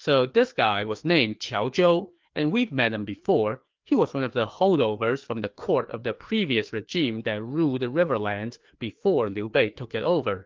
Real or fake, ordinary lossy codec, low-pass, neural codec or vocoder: fake; Opus, 32 kbps; 7.2 kHz; codec, 16 kHz, 2 kbps, FreqCodec, larger model